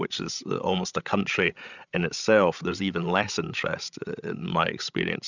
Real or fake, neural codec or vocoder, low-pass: fake; codec, 16 kHz, 16 kbps, FreqCodec, larger model; 7.2 kHz